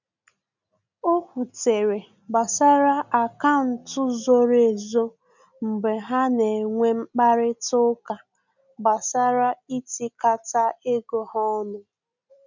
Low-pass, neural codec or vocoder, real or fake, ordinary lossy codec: 7.2 kHz; none; real; none